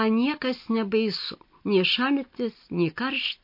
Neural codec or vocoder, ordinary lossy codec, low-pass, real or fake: none; MP3, 32 kbps; 5.4 kHz; real